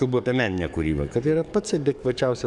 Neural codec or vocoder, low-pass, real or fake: codec, 44.1 kHz, 7.8 kbps, DAC; 10.8 kHz; fake